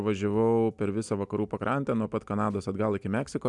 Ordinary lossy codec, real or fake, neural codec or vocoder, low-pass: MP3, 96 kbps; real; none; 10.8 kHz